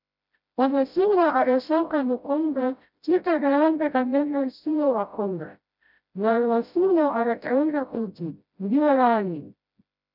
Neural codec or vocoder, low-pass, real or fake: codec, 16 kHz, 0.5 kbps, FreqCodec, smaller model; 5.4 kHz; fake